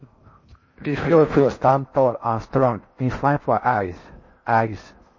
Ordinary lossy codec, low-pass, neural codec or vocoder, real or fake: MP3, 32 kbps; 7.2 kHz; codec, 16 kHz in and 24 kHz out, 0.6 kbps, FocalCodec, streaming, 4096 codes; fake